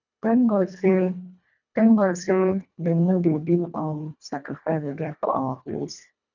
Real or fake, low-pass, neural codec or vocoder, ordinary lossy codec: fake; 7.2 kHz; codec, 24 kHz, 1.5 kbps, HILCodec; none